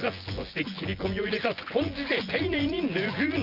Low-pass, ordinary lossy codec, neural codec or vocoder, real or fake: 5.4 kHz; Opus, 32 kbps; vocoder, 24 kHz, 100 mel bands, Vocos; fake